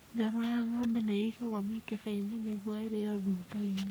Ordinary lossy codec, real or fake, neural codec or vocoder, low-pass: none; fake; codec, 44.1 kHz, 3.4 kbps, Pupu-Codec; none